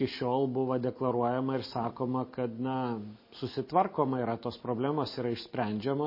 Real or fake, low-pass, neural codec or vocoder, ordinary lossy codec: real; 5.4 kHz; none; MP3, 24 kbps